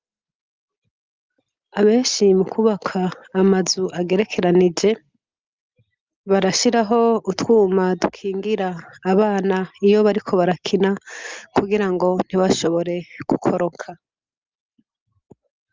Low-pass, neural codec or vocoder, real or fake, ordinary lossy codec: 7.2 kHz; none; real; Opus, 32 kbps